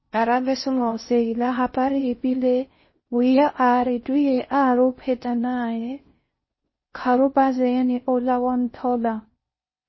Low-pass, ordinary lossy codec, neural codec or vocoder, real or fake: 7.2 kHz; MP3, 24 kbps; codec, 16 kHz in and 24 kHz out, 0.6 kbps, FocalCodec, streaming, 4096 codes; fake